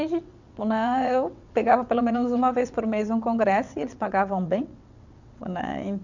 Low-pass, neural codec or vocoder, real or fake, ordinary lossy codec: 7.2 kHz; vocoder, 22.05 kHz, 80 mel bands, WaveNeXt; fake; none